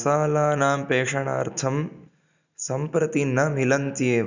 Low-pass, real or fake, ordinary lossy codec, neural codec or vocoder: 7.2 kHz; real; none; none